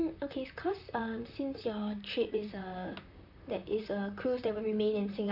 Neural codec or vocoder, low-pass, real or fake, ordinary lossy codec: vocoder, 44.1 kHz, 128 mel bands, Pupu-Vocoder; 5.4 kHz; fake; none